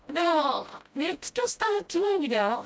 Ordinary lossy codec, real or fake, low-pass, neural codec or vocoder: none; fake; none; codec, 16 kHz, 0.5 kbps, FreqCodec, smaller model